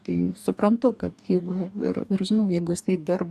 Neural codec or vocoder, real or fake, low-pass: codec, 44.1 kHz, 2.6 kbps, DAC; fake; 14.4 kHz